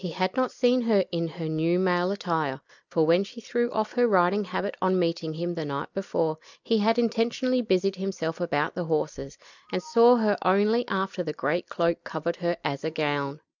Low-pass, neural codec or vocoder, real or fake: 7.2 kHz; none; real